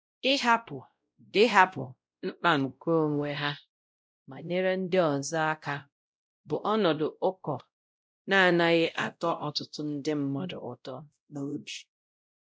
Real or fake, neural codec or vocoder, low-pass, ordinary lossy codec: fake; codec, 16 kHz, 0.5 kbps, X-Codec, WavLM features, trained on Multilingual LibriSpeech; none; none